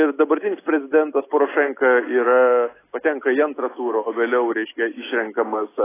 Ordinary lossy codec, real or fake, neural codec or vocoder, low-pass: AAC, 16 kbps; real; none; 3.6 kHz